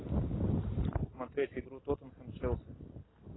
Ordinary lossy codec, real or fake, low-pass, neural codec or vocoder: AAC, 16 kbps; real; 7.2 kHz; none